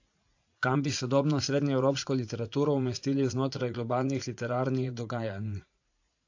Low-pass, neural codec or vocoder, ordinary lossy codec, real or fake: 7.2 kHz; vocoder, 44.1 kHz, 80 mel bands, Vocos; AAC, 48 kbps; fake